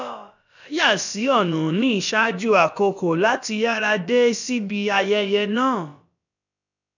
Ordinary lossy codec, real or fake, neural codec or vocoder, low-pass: none; fake; codec, 16 kHz, about 1 kbps, DyCAST, with the encoder's durations; 7.2 kHz